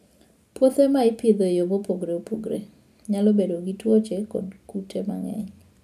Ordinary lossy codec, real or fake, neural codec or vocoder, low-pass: none; real; none; 14.4 kHz